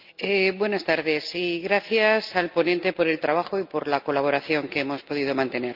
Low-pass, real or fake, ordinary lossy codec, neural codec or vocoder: 5.4 kHz; real; Opus, 24 kbps; none